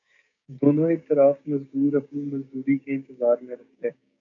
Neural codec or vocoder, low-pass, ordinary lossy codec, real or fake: codec, 16 kHz, 6 kbps, DAC; 7.2 kHz; AAC, 64 kbps; fake